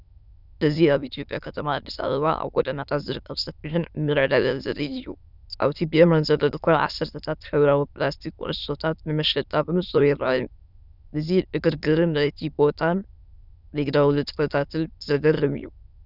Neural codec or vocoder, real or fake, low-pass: autoencoder, 22.05 kHz, a latent of 192 numbers a frame, VITS, trained on many speakers; fake; 5.4 kHz